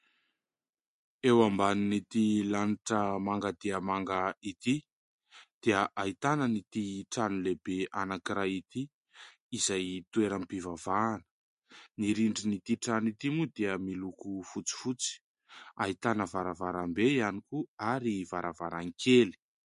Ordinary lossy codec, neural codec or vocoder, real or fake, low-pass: MP3, 48 kbps; none; real; 10.8 kHz